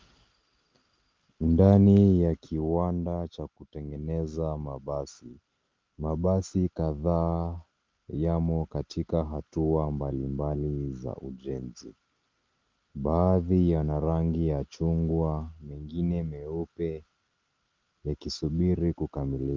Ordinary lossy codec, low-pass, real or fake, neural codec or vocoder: Opus, 32 kbps; 7.2 kHz; real; none